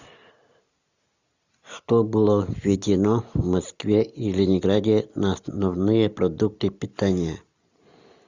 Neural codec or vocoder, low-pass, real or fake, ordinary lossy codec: none; 7.2 kHz; real; Opus, 64 kbps